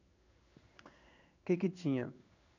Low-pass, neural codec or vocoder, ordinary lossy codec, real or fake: 7.2 kHz; codec, 16 kHz in and 24 kHz out, 1 kbps, XY-Tokenizer; none; fake